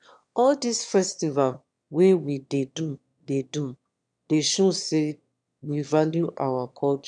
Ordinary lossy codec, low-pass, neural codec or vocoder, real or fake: none; 9.9 kHz; autoencoder, 22.05 kHz, a latent of 192 numbers a frame, VITS, trained on one speaker; fake